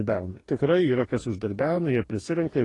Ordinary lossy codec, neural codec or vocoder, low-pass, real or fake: AAC, 32 kbps; codec, 44.1 kHz, 2.6 kbps, DAC; 10.8 kHz; fake